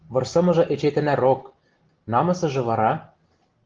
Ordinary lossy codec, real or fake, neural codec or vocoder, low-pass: Opus, 16 kbps; real; none; 7.2 kHz